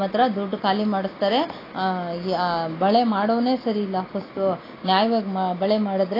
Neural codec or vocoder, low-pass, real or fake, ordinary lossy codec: none; 5.4 kHz; real; AAC, 24 kbps